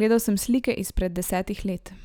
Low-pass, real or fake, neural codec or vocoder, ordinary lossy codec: none; real; none; none